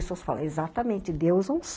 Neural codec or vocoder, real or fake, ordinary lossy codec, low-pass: none; real; none; none